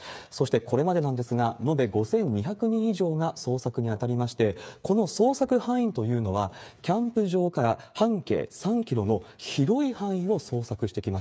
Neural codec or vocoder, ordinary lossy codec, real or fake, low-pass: codec, 16 kHz, 8 kbps, FreqCodec, smaller model; none; fake; none